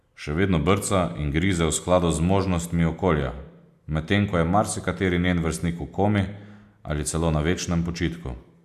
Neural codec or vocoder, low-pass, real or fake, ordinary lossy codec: none; 14.4 kHz; real; AAC, 96 kbps